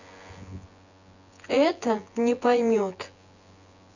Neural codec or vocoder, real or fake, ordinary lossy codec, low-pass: vocoder, 24 kHz, 100 mel bands, Vocos; fake; none; 7.2 kHz